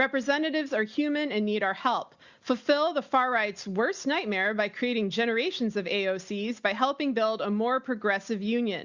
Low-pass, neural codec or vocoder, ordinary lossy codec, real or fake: 7.2 kHz; none; Opus, 64 kbps; real